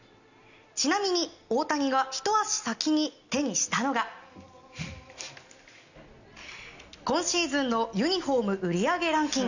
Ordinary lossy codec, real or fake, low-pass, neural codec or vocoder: none; real; 7.2 kHz; none